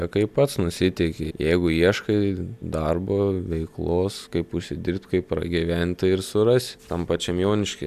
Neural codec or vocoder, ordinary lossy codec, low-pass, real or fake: none; AAC, 96 kbps; 14.4 kHz; real